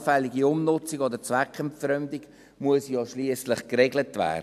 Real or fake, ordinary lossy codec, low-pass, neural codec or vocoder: real; none; 14.4 kHz; none